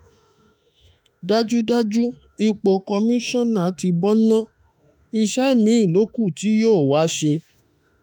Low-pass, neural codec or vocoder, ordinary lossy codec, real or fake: 19.8 kHz; autoencoder, 48 kHz, 32 numbers a frame, DAC-VAE, trained on Japanese speech; none; fake